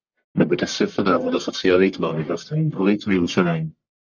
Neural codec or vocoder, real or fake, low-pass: codec, 44.1 kHz, 1.7 kbps, Pupu-Codec; fake; 7.2 kHz